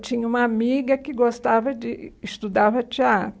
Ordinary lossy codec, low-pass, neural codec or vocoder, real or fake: none; none; none; real